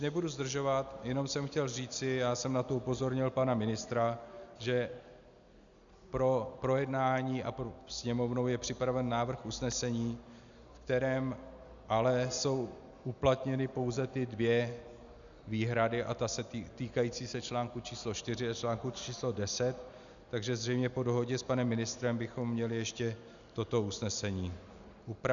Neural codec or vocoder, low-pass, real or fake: none; 7.2 kHz; real